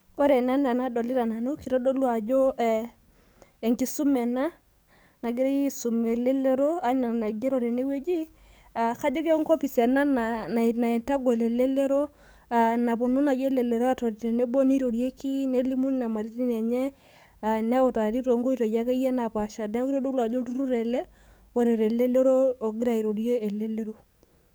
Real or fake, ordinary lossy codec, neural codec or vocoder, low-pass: fake; none; codec, 44.1 kHz, 7.8 kbps, DAC; none